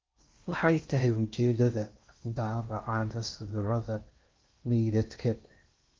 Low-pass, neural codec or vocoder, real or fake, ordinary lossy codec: 7.2 kHz; codec, 16 kHz in and 24 kHz out, 0.6 kbps, FocalCodec, streaming, 4096 codes; fake; Opus, 32 kbps